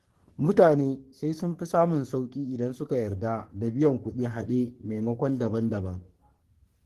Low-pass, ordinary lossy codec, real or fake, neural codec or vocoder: 14.4 kHz; Opus, 16 kbps; fake; codec, 44.1 kHz, 3.4 kbps, Pupu-Codec